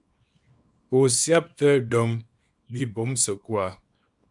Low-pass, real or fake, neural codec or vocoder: 10.8 kHz; fake; codec, 24 kHz, 0.9 kbps, WavTokenizer, small release